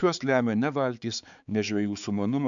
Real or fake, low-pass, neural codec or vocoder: fake; 7.2 kHz; codec, 16 kHz, 4 kbps, X-Codec, HuBERT features, trained on balanced general audio